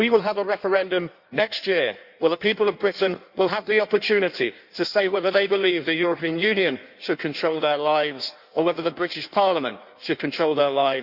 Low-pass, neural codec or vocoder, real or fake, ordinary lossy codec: 5.4 kHz; codec, 16 kHz in and 24 kHz out, 1.1 kbps, FireRedTTS-2 codec; fake; Opus, 64 kbps